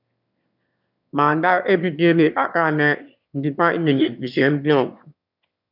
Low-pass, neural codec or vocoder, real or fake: 5.4 kHz; autoencoder, 22.05 kHz, a latent of 192 numbers a frame, VITS, trained on one speaker; fake